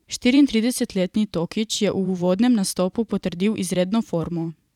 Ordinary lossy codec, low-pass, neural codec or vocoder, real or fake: none; 19.8 kHz; vocoder, 44.1 kHz, 128 mel bands every 512 samples, BigVGAN v2; fake